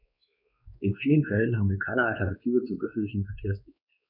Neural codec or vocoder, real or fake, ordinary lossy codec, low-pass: codec, 16 kHz, 2 kbps, X-Codec, WavLM features, trained on Multilingual LibriSpeech; fake; none; 5.4 kHz